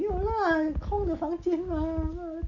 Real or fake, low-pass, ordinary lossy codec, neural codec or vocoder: real; 7.2 kHz; none; none